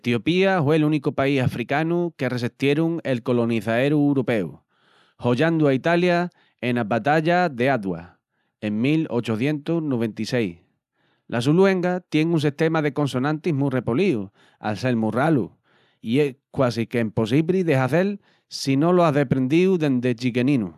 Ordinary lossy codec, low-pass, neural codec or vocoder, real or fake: none; 14.4 kHz; none; real